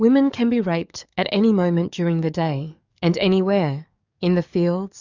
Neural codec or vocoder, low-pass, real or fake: codec, 44.1 kHz, 7.8 kbps, DAC; 7.2 kHz; fake